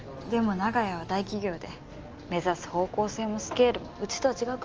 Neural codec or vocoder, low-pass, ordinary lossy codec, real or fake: none; 7.2 kHz; Opus, 24 kbps; real